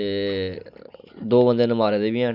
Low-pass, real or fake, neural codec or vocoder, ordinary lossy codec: 5.4 kHz; real; none; none